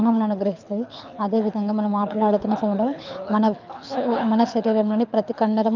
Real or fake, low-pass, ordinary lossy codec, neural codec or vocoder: fake; 7.2 kHz; none; codec, 24 kHz, 6 kbps, HILCodec